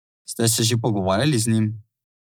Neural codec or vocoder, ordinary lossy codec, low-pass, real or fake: none; none; none; real